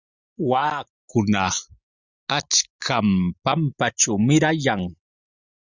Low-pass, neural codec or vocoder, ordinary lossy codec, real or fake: 7.2 kHz; none; Opus, 64 kbps; real